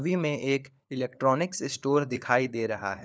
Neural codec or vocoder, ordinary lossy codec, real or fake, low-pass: codec, 16 kHz, 4 kbps, FunCodec, trained on LibriTTS, 50 frames a second; none; fake; none